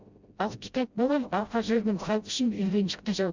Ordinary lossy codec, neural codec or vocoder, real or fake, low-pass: Opus, 64 kbps; codec, 16 kHz, 0.5 kbps, FreqCodec, smaller model; fake; 7.2 kHz